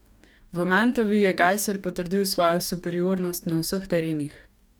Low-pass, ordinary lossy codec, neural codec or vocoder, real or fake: none; none; codec, 44.1 kHz, 2.6 kbps, DAC; fake